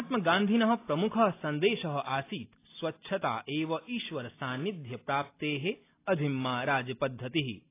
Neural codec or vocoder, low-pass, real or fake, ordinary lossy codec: none; 3.6 kHz; real; AAC, 24 kbps